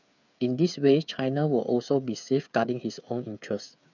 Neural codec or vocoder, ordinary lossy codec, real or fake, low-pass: codec, 16 kHz, 8 kbps, FreqCodec, smaller model; none; fake; 7.2 kHz